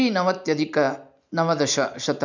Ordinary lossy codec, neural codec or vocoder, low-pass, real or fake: none; none; 7.2 kHz; real